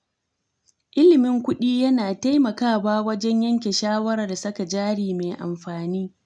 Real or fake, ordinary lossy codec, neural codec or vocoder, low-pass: real; none; none; 9.9 kHz